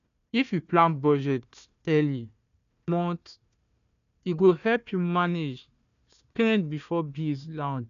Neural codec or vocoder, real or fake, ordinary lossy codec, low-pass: codec, 16 kHz, 1 kbps, FunCodec, trained on Chinese and English, 50 frames a second; fake; none; 7.2 kHz